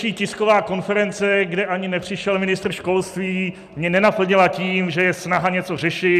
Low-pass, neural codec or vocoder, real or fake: 14.4 kHz; none; real